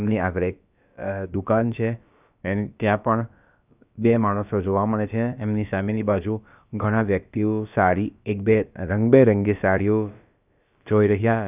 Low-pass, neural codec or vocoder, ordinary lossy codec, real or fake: 3.6 kHz; codec, 16 kHz, about 1 kbps, DyCAST, with the encoder's durations; none; fake